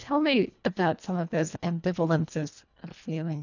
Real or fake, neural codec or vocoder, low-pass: fake; codec, 24 kHz, 1.5 kbps, HILCodec; 7.2 kHz